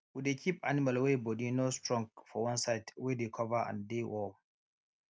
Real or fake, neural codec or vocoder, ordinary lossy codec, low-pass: real; none; none; none